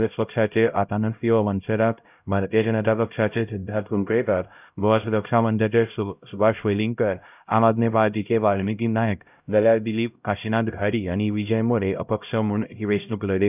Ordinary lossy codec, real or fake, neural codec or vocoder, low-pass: none; fake; codec, 16 kHz, 0.5 kbps, X-Codec, HuBERT features, trained on LibriSpeech; 3.6 kHz